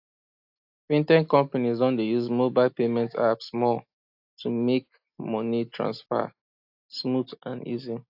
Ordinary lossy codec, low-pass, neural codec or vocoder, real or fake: AAC, 48 kbps; 5.4 kHz; none; real